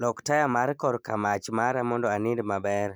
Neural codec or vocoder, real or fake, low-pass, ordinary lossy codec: none; real; none; none